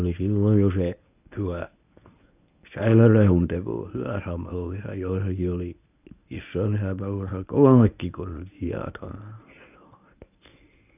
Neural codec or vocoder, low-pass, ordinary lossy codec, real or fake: codec, 24 kHz, 0.9 kbps, WavTokenizer, medium speech release version 1; 3.6 kHz; none; fake